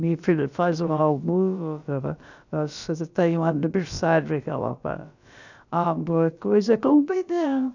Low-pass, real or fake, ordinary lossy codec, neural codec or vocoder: 7.2 kHz; fake; none; codec, 16 kHz, about 1 kbps, DyCAST, with the encoder's durations